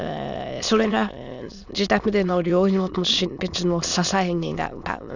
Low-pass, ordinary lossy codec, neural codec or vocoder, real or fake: 7.2 kHz; none; autoencoder, 22.05 kHz, a latent of 192 numbers a frame, VITS, trained on many speakers; fake